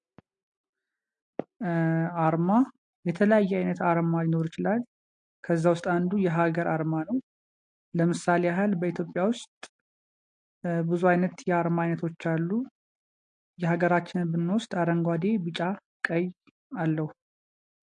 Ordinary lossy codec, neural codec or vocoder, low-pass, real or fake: MP3, 48 kbps; none; 9.9 kHz; real